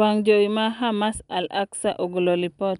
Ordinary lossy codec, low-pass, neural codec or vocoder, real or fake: none; 10.8 kHz; none; real